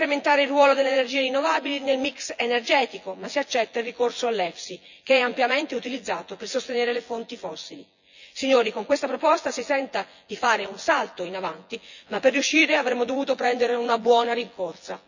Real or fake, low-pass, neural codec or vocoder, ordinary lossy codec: fake; 7.2 kHz; vocoder, 24 kHz, 100 mel bands, Vocos; none